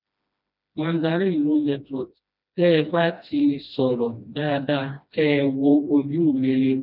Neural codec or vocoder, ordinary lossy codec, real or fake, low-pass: codec, 16 kHz, 1 kbps, FreqCodec, smaller model; none; fake; 5.4 kHz